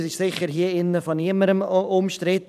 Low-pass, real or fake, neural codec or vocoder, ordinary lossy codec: 14.4 kHz; fake; vocoder, 44.1 kHz, 128 mel bands every 512 samples, BigVGAN v2; none